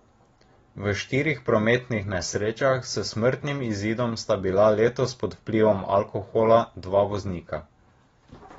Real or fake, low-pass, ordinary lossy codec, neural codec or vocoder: real; 19.8 kHz; AAC, 24 kbps; none